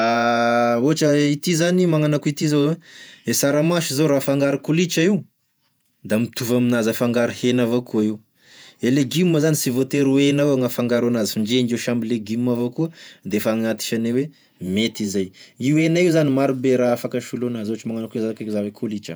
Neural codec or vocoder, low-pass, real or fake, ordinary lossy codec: vocoder, 48 kHz, 128 mel bands, Vocos; none; fake; none